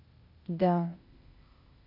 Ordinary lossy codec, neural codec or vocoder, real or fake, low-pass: AAC, 32 kbps; codec, 16 kHz, 0.8 kbps, ZipCodec; fake; 5.4 kHz